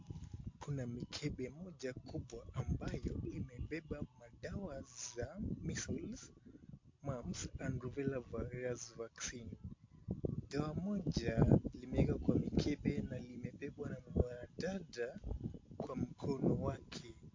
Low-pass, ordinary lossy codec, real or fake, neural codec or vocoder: 7.2 kHz; MP3, 48 kbps; real; none